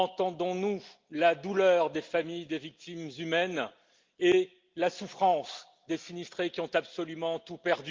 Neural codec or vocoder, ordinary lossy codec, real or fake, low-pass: none; Opus, 32 kbps; real; 7.2 kHz